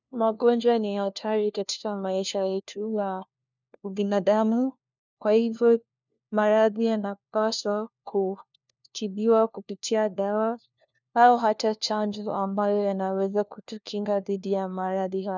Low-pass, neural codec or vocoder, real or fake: 7.2 kHz; codec, 16 kHz, 1 kbps, FunCodec, trained on LibriTTS, 50 frames a second; fake